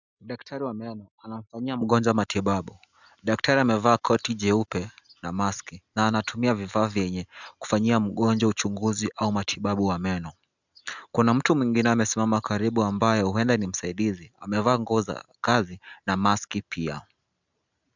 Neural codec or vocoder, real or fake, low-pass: none; real; 7.2 kHz